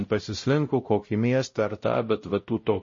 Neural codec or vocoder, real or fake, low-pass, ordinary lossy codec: codec, 16 kHz, 0.5 kbps, X-Codec, WavLM features, trained on Multilingual LibriSpeech; fake; 7.2 kHz; MP3, 32 kbps